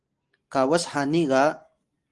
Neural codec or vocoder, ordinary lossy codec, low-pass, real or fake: none; Opus, 24 kbps; 10.8 kHz; real